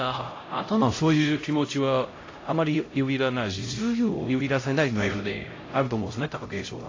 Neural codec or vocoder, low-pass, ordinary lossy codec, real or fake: codec, 16 kHz, 0.5 kbps, X-Codec, HuBERT features, trained on LibriSpeech; 7.2 kHz; AAC, 32 kbps; fake